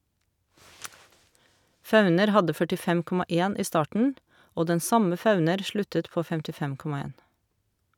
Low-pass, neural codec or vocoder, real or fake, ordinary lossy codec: 19.8 kHz; none; real; none